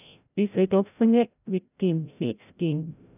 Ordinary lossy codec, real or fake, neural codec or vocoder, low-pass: none; fake; codec, 16 kHz, 0.5 kbps, FreqCodec, larger model; 3.6 kHz